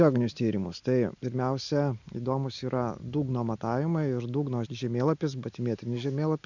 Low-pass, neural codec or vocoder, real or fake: 7.2 kHz; none; real